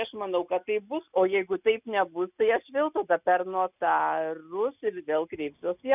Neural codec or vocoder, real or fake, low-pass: none; real; 3.6 kHz